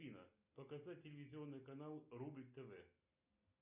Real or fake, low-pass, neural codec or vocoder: real; 3.6 kHz; none